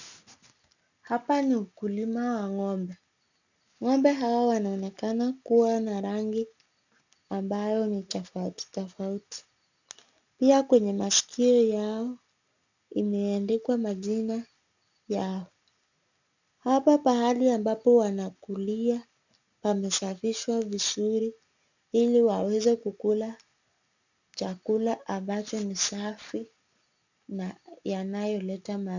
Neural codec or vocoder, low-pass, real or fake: none; 7.2 kHz; real